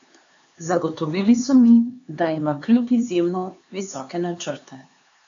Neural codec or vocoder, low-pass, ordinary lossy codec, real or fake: codec, 16 kHz, 4 kbps, X-Codec, HuBERT features, trained on LibriSpeech; 7.2 kHz; AAC, 64 kbps; fake